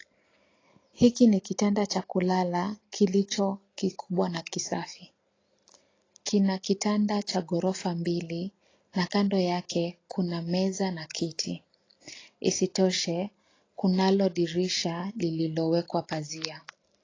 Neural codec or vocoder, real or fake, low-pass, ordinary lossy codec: none; real; 7.2 kHz; AAC, 32 kbps